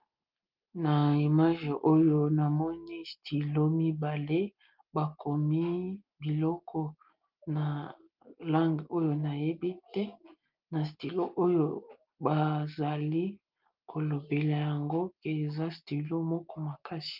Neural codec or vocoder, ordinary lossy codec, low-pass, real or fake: none; Opus, 24 kbps; 5.4 kHz; real